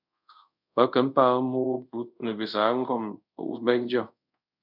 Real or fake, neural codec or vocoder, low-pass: fake; codec, 24 kHz, 0.5 kbps, DualCodec; 5.4 kHz